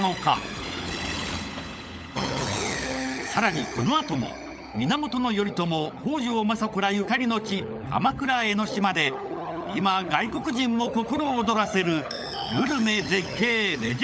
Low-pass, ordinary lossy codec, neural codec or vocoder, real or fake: none; none; codec, 16 kHz, 16 kbps, FunCodec, trained on LibriTTS, 50 frames a second; fake